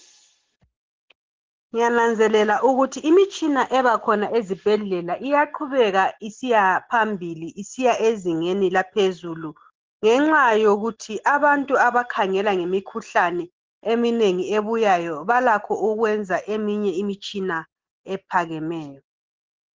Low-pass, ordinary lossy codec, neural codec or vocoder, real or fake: 7.2 kHz; Opus, 16 kbps; none; real